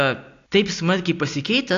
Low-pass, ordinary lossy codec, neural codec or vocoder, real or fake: 7.2 kHz; AAC, 48 kbps; none; real